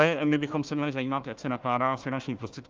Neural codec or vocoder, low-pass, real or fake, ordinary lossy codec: codec, 16 kHz, 1 kbps, FunCodec, trained on Chinese and English, 50 frames a second; 7.2 kHz; fake; Opus, 24 kbps